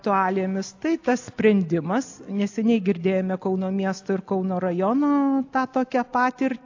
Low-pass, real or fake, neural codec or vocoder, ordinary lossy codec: 7.2 kHz; real; none; AAC, 48 kbps